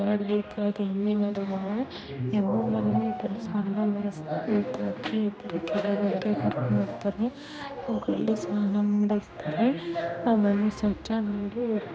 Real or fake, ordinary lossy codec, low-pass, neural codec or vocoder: fake; none; none; codec, 16 kHz, 1 kbps, X-Codec, HuBERT features, trained on general audio